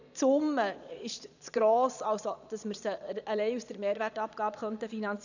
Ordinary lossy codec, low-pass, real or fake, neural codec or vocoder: none; 7.2 kHz; fake; vocoder, 22.05 kHz, 80 mel bands, Vocos